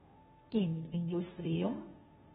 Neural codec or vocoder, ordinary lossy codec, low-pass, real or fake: codec, 16 kHz, 0.5 kbps, FunCodec, trained on Chinese and English, 25 frames a second; AAC, 16 kbps; 7.2 kHz; fake